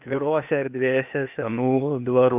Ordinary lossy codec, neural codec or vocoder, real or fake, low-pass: AAC, 32 kbps; codec, 16 kHz, 0.8 kbps, ZipCodec; fake; 3.6 kHz